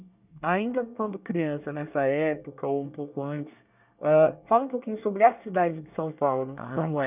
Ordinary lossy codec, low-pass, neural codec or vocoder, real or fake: none; 3.6 kHz; codec, 24 kHz, 1 kbps, SNAC; fake